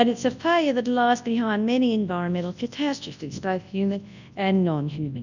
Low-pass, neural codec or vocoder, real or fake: 7.2 kHz; codec, 24 kHz, 0.9 kbps, WavTokenizer, large speech release; fake